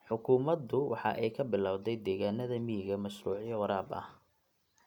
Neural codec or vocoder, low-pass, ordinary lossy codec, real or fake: none; 19.8 kHz; none; real